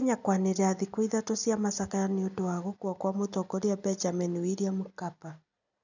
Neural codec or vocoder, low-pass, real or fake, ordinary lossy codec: none; 7.2 kHz; real; none